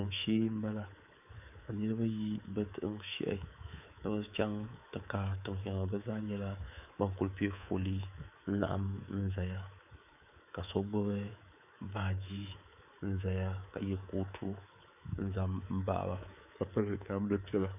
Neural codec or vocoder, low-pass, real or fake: codec, 24 kHz, 3.1 kbps, DualCodec; 3.6 kHz; fake